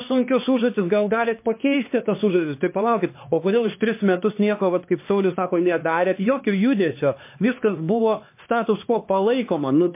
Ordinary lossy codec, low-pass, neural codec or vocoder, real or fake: MP3, 24 kbps; 3.6 kHz; codec, 16 kHz, 2 kbps, X-Codec, HuBERT features, trained on LibriSpeech; fake